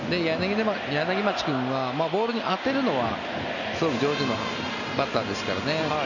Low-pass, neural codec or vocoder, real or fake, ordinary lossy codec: 7.2 kHz; none; real; none